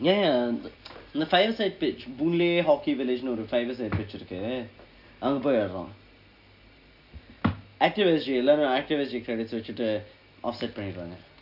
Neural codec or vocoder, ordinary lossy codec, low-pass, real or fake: none; none; 5.4 kHz; real